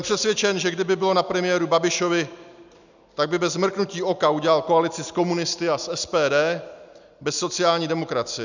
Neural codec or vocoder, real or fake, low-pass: none; real; 7.2 kHz